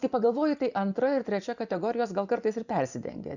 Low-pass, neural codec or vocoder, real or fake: 7.2 kHz; vocoder, 24 kHz, 100 mel bands, Vocos; fake